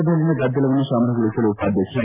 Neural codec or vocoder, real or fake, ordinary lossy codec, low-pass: none; real; MP3, 24 kbps; 3.6 kHz